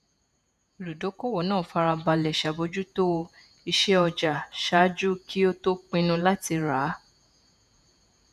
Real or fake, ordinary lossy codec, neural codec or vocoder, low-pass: fake; none; vocoder, 48 kHz, 128 mel bands, Vocos; 14.4 kHz